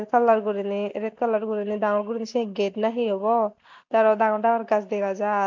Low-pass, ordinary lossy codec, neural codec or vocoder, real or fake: 7.2 kHz; MP3, 64 kbps; none; real